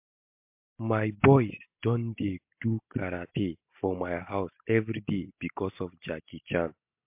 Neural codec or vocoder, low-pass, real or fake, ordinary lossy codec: none; 3.6 kHz; real; MP3, 32 kbps